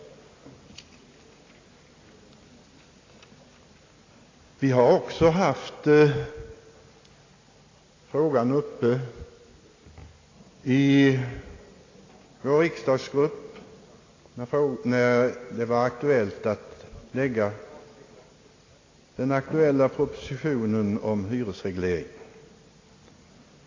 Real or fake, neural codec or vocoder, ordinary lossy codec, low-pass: real; none; AAC, 32 kbps; 7.2 kHz